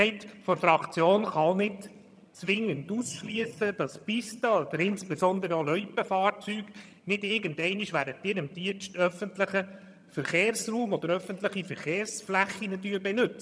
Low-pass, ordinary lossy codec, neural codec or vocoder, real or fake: none; none; vocoder, 22.05 kHz, 80 mel bands, HiFi-GAN; fake